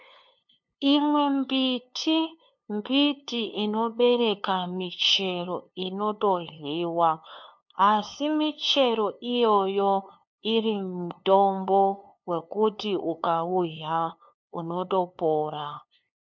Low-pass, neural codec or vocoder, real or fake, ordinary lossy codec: 7.2 kHz; codec, 16 kHz, 2 kbps, FunCodec, trained on LibriTTS, 25 frames a second; fake; MP3, 48 kbps